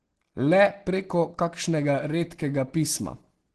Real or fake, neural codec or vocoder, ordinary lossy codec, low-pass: real; none; Opus, 16 kbps; 10.8 kHz